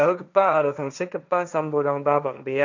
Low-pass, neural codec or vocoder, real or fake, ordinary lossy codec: 7.2 kHz; codec, 16 kHz, 1.1 kbps, Voila-Tokenizer; fake; none